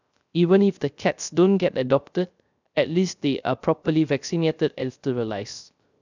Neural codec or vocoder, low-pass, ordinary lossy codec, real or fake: codec, 16 kHz, 0.3 kbps, FocalCodec; 7.2 kHz; none; fake